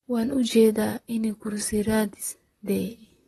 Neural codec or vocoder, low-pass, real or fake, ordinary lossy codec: vocoder, 44.1 kHz, 128 mel bands, Pupu-Vocoder; 19.8 kHz; fake; AAC, 32 kbps